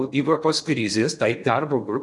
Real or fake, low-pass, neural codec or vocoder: fake; 10.8 kHz; codec, 16 kHz in and 24 kHz out, 0.8 kbps, FocalCodec, streaming, 65536 codes